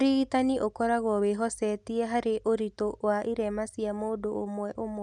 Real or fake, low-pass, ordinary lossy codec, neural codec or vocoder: real; 10.8 kHz; none; none